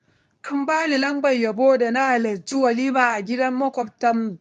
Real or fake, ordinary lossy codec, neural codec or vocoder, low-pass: fake; none; codec, 24 kHz, 0.9 kbps, WavTokenizer, medium speech release version 2; 10.8 kHz